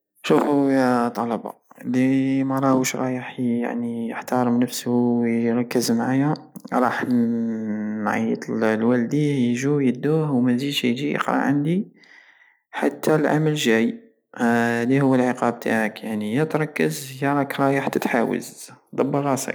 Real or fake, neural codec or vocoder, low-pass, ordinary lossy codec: real; none; none; none